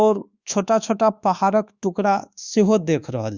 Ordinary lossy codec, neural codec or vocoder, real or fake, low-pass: Opus, 64 kbps; codec, 24 kHz, 1.2 kbps, DualCodec; fake; 7.2 kHz